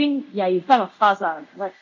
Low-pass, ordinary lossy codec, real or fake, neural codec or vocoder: 7.2 kHz; MP3, 64 kbps; fake; codec, 24 kHz, 0.5 kbps, DualCodec